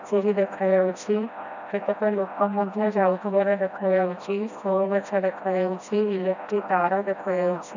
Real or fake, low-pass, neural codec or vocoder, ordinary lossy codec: fake; 7.2 kHz; codec, 16 kHz, 1 kbps, FreqCodec, smaller model; none